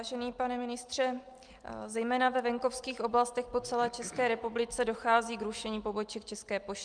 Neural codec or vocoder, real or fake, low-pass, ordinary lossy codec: none; real; 9.9 kHz; Opus, 32 kbps